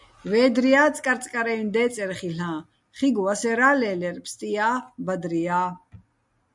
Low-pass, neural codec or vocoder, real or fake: 10.8 kHz; none; real